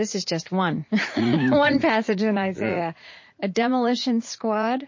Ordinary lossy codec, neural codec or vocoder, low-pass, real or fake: MP3, 32 kbps; vocoder, 44.1 kHz, 80 mel bands, Vocos; 7.2 kHz; fake